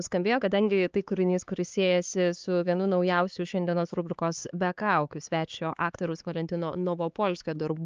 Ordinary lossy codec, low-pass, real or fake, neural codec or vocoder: Opus, 24 kbps; 7.2 kHz; fake; codec, 16 kHz, 4 kbps, X-Codec, HuBERT features, trained on LibriSpeech